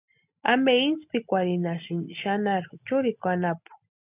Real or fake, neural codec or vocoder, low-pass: real; none; 3.6 kHz